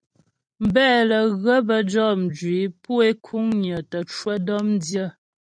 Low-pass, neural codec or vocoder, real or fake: 9.9 kHz; vocoder, 44.1 kHz, 128 mel bands every 256 samples, BigVGAN v2; fake